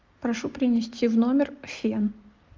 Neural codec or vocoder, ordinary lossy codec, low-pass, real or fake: none; Opus, 32 kbps; 7.2 kHz; real